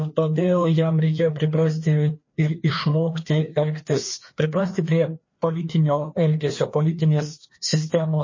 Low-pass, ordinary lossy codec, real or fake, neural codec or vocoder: 7.2 kHz; MP3, 32 kbps; fake; codec, 16 kHz, 2 kbps, FreqCodec, larger model